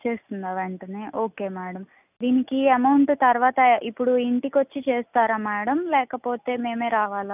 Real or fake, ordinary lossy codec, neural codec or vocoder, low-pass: real; none; none; 3.6 kHz